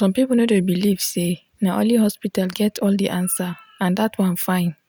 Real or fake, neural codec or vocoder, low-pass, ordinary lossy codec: real; none; none; none